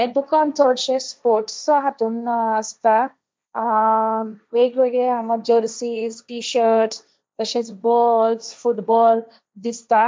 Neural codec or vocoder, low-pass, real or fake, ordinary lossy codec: codec, 16 kHz, 1.1 kbps, Voila-Tokenizer; none; fake; none